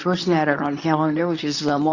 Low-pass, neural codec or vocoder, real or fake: 7.2 kHz; codec, 24 kHz, 0.9 kbps, WavTokenizer, medium speech release version 2; fake